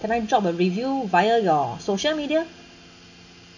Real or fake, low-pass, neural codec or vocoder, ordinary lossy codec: real; 7.2 kHz; none; none